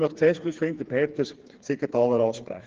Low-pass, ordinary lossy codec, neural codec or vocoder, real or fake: 7.2 kHz; Opus, 16 kbps; codec, 16 kHz, 4 kbps, FreqCodec, smaller model; fake